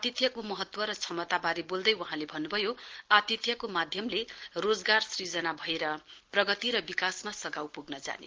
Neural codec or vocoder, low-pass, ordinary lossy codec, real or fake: none; 7.2 kHz; Opus, 16 kbps; real